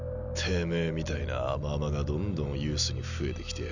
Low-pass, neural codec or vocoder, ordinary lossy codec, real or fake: 7.2 kHz; none; none; real